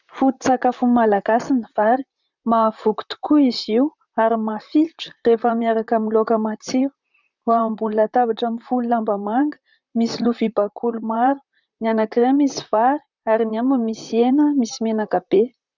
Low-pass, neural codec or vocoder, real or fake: 7.2 kHz; vocoder, 44.1 kHz, 128 mel bands, Pupu-Vocoder; fake